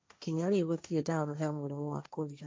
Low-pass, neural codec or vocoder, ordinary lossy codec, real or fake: none; codec, 16 kHz, 1.1 kbps, Voila-Tokenizer; none; fake